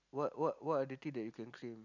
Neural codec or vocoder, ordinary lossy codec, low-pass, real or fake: none; none; 7.2 kHz; real